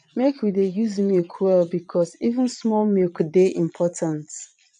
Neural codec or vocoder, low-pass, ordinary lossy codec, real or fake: vocoder, 22.05 kHz, 80 mel bands, Vocos; 9.9 kHz; none; fake